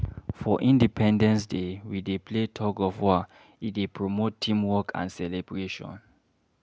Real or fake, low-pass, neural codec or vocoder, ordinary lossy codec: real; none; none; none